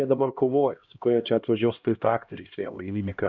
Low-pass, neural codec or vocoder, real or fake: 7.2 kHz; codec, 16 kHz, 1 kbps, X-Codec, HuBERT features, trained on LibriSpeech; fake